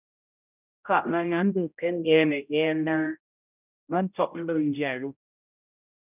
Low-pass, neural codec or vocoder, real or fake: 3.6 kHz; codec, 16 kHz, 0.5 kbps, X-Codec, HuBERT features, trained on general audio; fake